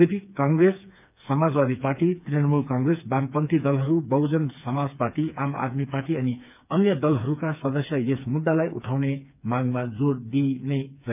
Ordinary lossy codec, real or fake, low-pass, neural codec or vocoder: none; fake; 3.6 kHz; codec, 16 kHz, 4 kbps, FreqCodec, smaller model